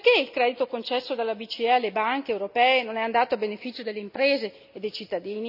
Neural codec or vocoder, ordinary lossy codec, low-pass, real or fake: none; none; 5.4 kHz; real